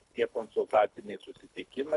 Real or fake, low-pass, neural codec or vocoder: fake; 10.8 kHz; codec, 24 kHz, 3 kbps, HILCodec